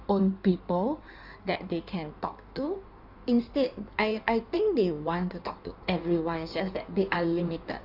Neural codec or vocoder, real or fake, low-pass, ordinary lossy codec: codec, 16 kHz in and 24 kHz out, 1.1 kbps, FireRedTTS-2 codec; fake; 5.4 kHz; none